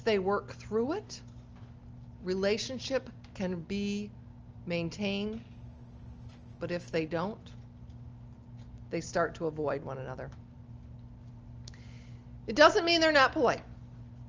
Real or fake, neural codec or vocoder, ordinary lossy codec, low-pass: real; none; Opus, 24 kbps; 7.2 kHz